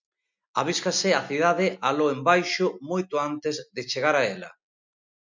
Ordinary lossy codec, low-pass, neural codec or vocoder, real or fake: MP3, 64 kbps; 7.2 kHz; none; real